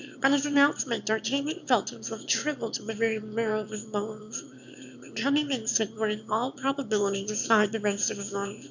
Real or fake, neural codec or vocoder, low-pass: fake; autoencoder, 22.05 kHz, a latent of 192 numbers a frame, VITS, trained on one speaker; 7.2 kHz